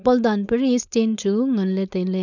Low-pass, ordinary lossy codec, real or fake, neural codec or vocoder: 7.2 kHz; none; fake; codec, 16 kHz, 4.8 kbps, FACodec